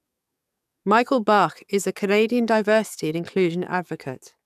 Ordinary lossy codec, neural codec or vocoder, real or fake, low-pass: none; codec, 44.1 kHz, 7.8 kbps, DAC; fake; 14.4 kHz